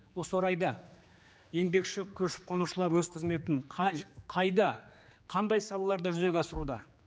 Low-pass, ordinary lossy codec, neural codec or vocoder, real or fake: none; none; codec, 16 kHz, 2 kbps, X-Codec, HuBERT features, trained on general audio; fake